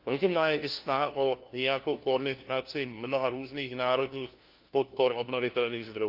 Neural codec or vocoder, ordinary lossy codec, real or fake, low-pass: codec, 16 kHz, 1 kbps, FunCodec, trained on LibriTTS, 50 frames a second; Opus, 32 kbps; fake; 5.4 kHz